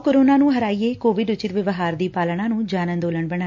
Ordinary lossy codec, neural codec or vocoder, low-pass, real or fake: MP3, 64 kbps; none; 7.2 kHz; real